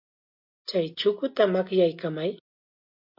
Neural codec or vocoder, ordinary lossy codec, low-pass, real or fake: none; MP3, 32 kbps; 5.4 kHz; real